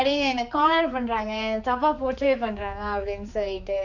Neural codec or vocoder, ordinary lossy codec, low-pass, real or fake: codec, 16 kHz, 4 kbps, X-Codec, HuBERT features, trained on general audio; none; 7.2 kHz; fake